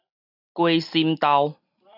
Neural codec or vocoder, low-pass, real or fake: none; 5.4 kHz; real